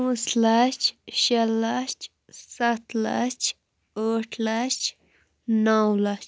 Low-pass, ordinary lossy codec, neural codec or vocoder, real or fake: none; none; none; real